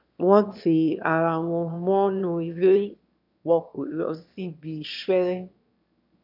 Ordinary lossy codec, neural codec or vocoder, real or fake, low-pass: none; autoencoder, 22.05 kHz, a latent of 192 numbers a frame, VITS, trained on one speaker; fake; 5.4 kHz